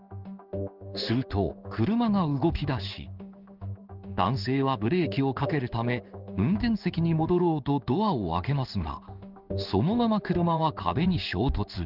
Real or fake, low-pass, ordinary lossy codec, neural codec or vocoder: fake; 5.4 kHz; Opus, 32 kbps; codec, 16 kHz in and 24 kHz out, 1 kbps, XY-Tokenizer